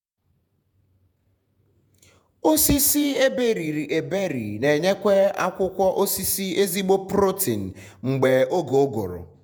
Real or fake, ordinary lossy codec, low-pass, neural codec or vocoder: fake; none; none; vocoder, 48 kHz, 128 mel bands, Vocos